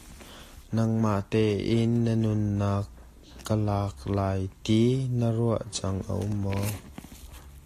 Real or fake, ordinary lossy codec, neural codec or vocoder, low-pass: real; AAC, 48 kbps; none; 14.4 kHz